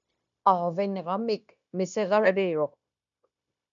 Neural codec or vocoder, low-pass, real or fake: codec, 16 kHz, 0.9 kbps, LongCat-Audio-Codec; 7.2 kHz; fake